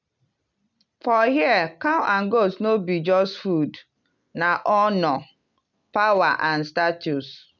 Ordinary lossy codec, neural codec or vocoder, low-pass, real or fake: none; none; 7.2 kHz; real